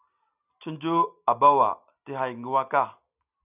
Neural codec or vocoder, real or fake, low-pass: none; real; 3.6 kHz